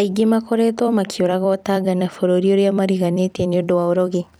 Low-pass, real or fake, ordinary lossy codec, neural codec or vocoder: 19.8 kHz; fake; none; vocoder, 44.1 kHz, 128 mel bands every 256 samples, BigVGAN v2